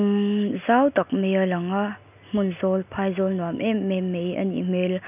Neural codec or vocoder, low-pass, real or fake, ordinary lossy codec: none; 3.6 kHz; real; none